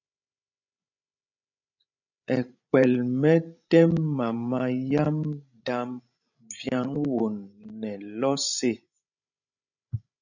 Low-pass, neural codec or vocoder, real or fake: 7.2 kHz; codec, 16 kHz, 16 kbps, FreqCodec, larger model; fake